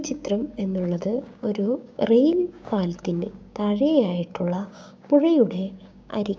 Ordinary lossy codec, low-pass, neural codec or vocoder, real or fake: none; none; codec, 16 kHz, 6 kbps, DAC; fake